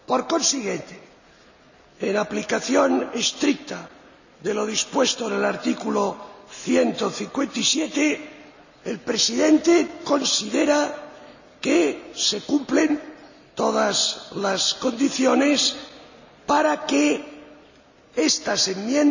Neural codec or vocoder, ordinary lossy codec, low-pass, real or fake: none; none; 7.2 kHz; real